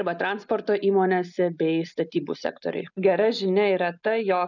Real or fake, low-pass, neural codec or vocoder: real; 7.2 kHz; none